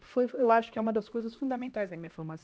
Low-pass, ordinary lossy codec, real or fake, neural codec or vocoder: none; none; fake; codec, 16 kHz, 1 kbps, X-Codec, HuBERT features, trained on LibriSpeech